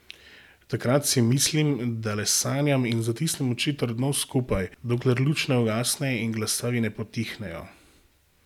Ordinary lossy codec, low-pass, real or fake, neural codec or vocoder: none; 19.8 kHz; real; none